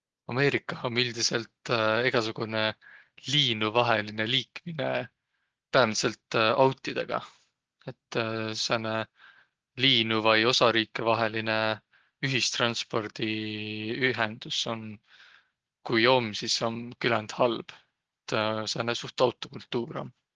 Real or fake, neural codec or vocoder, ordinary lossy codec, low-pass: real; none; Opus, 16 kbps; 7.2 kHz